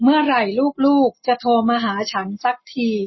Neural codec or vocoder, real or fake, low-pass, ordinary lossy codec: none; real; 7.2 kHz; MP3, 24 kbps